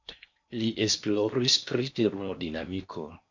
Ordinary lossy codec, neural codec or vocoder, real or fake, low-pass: MP3, 64 kbps; codec, 16 kHz in and 24 kHz out, 0.8 kbps, FocalCodec, streaming, 65536 codes; fake; 7.2 kHz